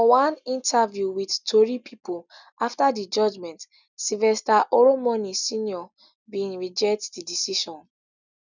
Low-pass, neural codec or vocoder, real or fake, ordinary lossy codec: 7.2 kHz; none; real; none